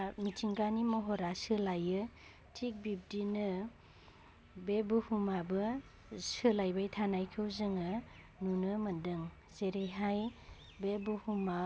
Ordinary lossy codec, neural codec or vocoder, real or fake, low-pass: none; none; real; none